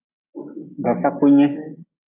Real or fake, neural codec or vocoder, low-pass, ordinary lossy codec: real; none; 3.6 kHz; AAC, 24 kbps